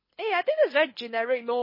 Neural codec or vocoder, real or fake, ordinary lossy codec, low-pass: codec, 24 kHz, 6 kbps, HILCodec; fake; MP3, 24 kbps; 5.4 kHz